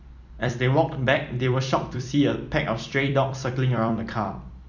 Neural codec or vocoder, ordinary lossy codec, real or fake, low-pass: vocoder, 44.1 kHz, 128 mel bands every 256 samples, BigVGAN v2; none; fake; 7.2 kHz